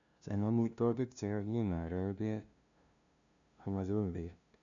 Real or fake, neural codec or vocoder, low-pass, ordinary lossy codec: fake; codec, 16 kHz, 0.5 kbps, FunCodec, trained on LibriTTS, 25 frames a second; 7.2 kHz; none